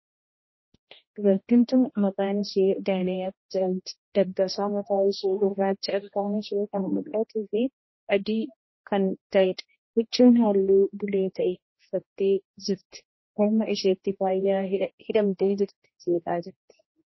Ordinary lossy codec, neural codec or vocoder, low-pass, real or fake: MP3, 24 kbps; codec, 16 kHz, 1 kbps, X-Codec, HuBERT features, trained on general audio; 7.2 kHz; fake